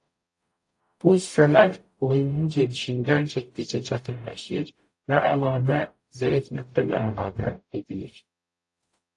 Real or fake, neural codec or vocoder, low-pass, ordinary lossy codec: fake; codec, 44.1 kHz, 0.9 kbps, DAC; 10.8 kHz; AAC, 48 kbps